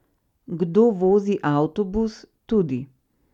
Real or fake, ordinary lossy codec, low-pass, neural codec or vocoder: real; none; 19.8 kHz; none